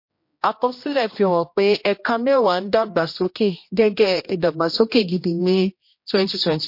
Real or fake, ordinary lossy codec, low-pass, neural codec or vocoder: fake; MP3, 32 kbps; 5.4 kHz; codec, 16 kHz, 1 kbps, X-Codec, HuBERT features, trained on general audio